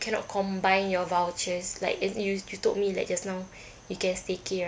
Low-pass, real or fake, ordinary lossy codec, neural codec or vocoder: none; real; none; none